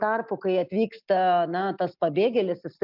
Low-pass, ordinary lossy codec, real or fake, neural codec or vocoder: 5.4 kHz; MP3, 48 kbps; real; none